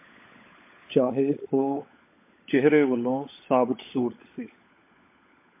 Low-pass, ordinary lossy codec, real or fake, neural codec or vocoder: 3.6 kHz; MP3, 24 kbps; fake; codec, 16 kHz, 16 kbps, FunCodec, trained on LibriTTS, 50 frames a second